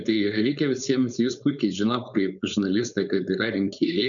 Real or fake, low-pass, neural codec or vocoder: fake; 7.2 kHz; codec, 16 kHz, 4.8 kbps, FACodec